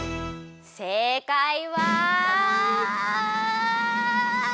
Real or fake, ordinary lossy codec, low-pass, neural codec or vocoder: real; none; none; none